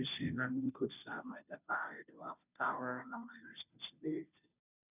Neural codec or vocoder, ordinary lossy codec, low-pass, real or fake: codec, 16 kHz, 0.5 kbps, FunCodec, trained on Chinese and English, 25 frames a second; none; 3.6 kHz; fake